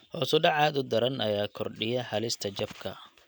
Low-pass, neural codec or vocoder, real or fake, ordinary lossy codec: none; none; real; none